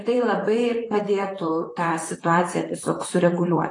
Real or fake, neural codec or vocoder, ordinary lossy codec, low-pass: fake; vocoder, 44.1 kHz, 128 mel bands, Pupu-Vocoder; AAC, 32 kbps; 10.8 kHz